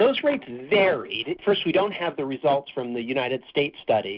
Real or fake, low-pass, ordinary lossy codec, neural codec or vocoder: real; 5.4 kHz; Opus, 64 kbps; none